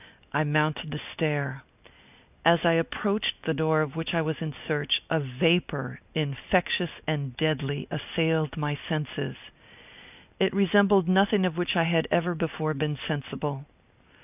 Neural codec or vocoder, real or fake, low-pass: none; real; 3.6 kHz